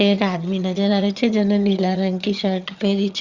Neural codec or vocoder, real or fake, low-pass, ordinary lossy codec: vocoder, 22.05 kHz, 80 mel bands, HiFi-GAN; fake; 7.2 kHz; Opus, 64 kbps